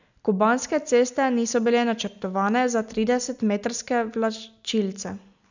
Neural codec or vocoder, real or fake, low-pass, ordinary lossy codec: none; real; 7.2 kHz; none